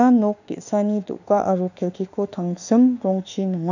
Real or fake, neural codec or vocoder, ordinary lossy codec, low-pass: fake; autoencoder, 48 kHz, 32 numbers a frame, DAC-VAE, trained on Japanese speech; none; 7.2 kHz